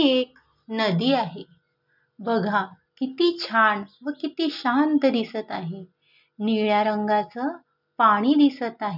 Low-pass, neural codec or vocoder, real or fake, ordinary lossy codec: 5.4 kHz; none; real; none